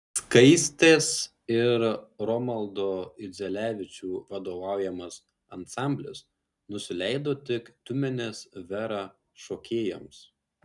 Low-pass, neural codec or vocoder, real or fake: 10.8 kHz; none; real